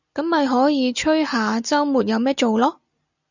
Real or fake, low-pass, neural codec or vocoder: real; 7.2 kHz; none